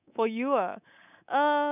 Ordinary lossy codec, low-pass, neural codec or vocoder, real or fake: none; 3.6 kHz; codec, 24 kHz, 3.1 kbps, DualCodec; fake